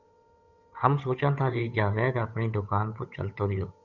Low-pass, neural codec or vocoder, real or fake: 7.2 kHz; codec, 16 kHz, 8 kbps, FunCodec, trained on Chinese and English, 25 frames a second; fake